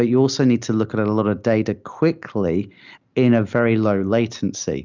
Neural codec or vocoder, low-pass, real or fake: none; 7.2 kHz; real